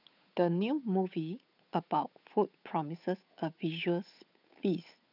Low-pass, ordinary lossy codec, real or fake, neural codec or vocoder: 5.4 kHz; none; fake; codec, 16 kHz, 8 kbps, FunCodec, trained on Chinese and English, 25 frames a second